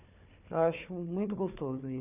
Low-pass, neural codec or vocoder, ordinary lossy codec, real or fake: 3.6 kHz; codec, 16 kHz, 4 kbps, FunCodec, trained on Chinese and English, 50 frames a second; none; fake